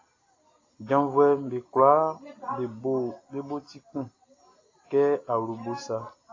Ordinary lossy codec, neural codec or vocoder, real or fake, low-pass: AAC, 32 kbps; none; real; 7.2 kHz